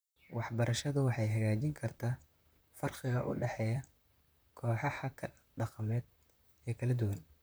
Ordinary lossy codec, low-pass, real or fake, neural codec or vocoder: none; none; fake; vocoder, 44.1 kHz, 128 mel bands, Pupu-Vocoder